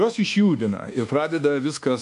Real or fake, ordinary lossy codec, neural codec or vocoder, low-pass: fake; MP3, 64 kbps; codec, 24 kHz, 1.2 kbps, DualCodec; 10.8 kHz